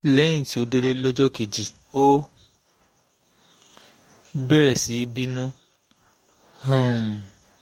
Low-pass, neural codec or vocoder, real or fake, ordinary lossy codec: 19.8 kHz; codec, 44.1 kHz, 2.6 kbps, DAC; fake; MP3, 64 kbps